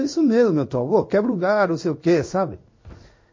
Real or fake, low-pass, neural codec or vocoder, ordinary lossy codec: fake; 7.2 kHz; codec, 16 kHz in and 24 kHz out, 1 kbps, XY-Tokenizer; MP3, 32 kbps